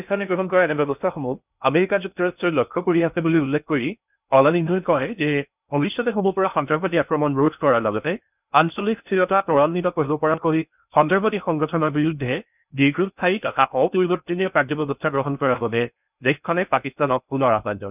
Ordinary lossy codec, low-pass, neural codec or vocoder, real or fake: none; 3.6 kHz; codec, 16 kHz in and 24 kHz out, 0.6 kbps, FocalCodec, streaming, 2048 codes; fake